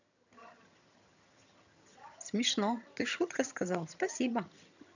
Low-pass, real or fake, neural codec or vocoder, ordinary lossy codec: 7.2 kHz; fake; vocoder, 22.05 kHz, 80 mel bands, HiFi-GAN; none